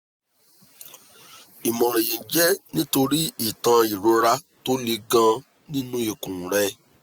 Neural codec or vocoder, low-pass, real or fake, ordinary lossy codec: none; none; real; none